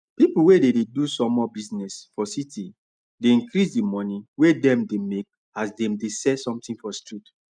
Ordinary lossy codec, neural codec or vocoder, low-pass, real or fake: none; none; 9.9 kHz; real